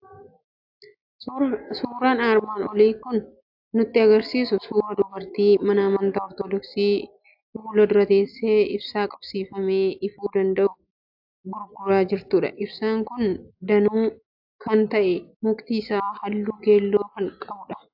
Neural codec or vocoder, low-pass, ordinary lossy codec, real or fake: none; 5.4 kHz; AAC, 48 kbps; real